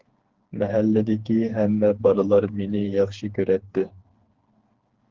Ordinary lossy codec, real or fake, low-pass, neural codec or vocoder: Opus, 16 kbps; fake; 7.2 kHz; codec, 16 kHz, 4 kbps, FreqCodec, smaller model